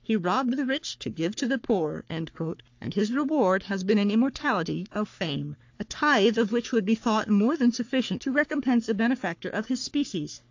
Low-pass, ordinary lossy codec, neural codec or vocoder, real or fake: 7.2 kHz; AAC, 48 kbps; codec, 44.1 kHz, 3.4 kbps, Pupu-Codec; fake